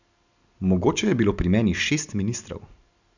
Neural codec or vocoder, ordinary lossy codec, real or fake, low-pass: none; none; real; 7.2 kHz